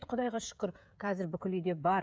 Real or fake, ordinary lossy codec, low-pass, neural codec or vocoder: fake; none; none; codec, 16 kHz, 16 kbps, FreqCodec, smaller model